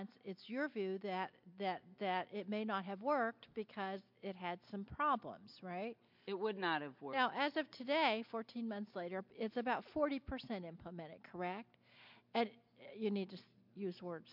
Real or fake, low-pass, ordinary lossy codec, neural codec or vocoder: real; 5.4 kHz; MP3, 48 kbps; none